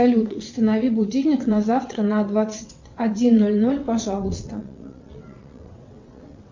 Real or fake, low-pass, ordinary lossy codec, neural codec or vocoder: fake; 7.2 kHz; MP3, 64 kbps; vocoder, 22.05 kHz, 80 mel bands, WaveNeXt